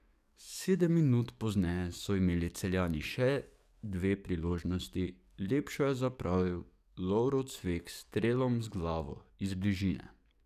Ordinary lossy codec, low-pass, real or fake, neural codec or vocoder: none; 14.4 kHz; fake; codec, 44.1 kHz, 7.8 kbps, DAC